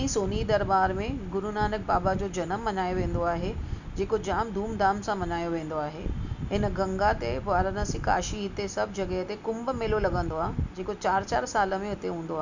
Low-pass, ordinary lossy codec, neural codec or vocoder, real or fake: 7.2 kHz; none; none; real